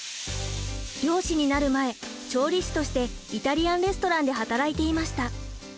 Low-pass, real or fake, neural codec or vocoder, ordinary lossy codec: none; real; none; none